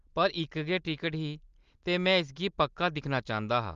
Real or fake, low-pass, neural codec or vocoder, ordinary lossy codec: real; 7.2 kHz; none; Opus, 32 kbps